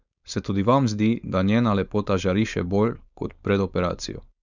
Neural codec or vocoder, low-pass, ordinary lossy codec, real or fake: codec, 16 kHz, 4.8 kbps, FACodec; 7.2 kHz; none; fake